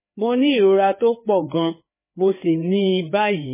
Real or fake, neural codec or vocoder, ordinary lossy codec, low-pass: fake; codec, 16 kHz, 4 kbps, FreqCodec, larger model; MP3, 16 kbps; 3.6 kHz